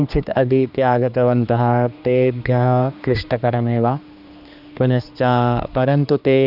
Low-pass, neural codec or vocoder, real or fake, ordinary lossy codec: 5.4 kHz; codec, 16 kHz, 2 kbps, X-Codec, HuBERT features, trained on general audio; fake; none